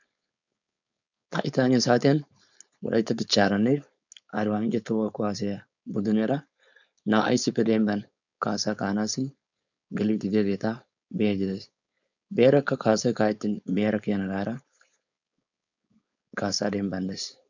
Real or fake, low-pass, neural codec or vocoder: fake; 7.2 kHz; codec, 16 kHz, 4.8 kbps, FACodec